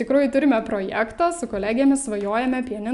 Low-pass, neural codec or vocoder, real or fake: 10.8 kHz; none; real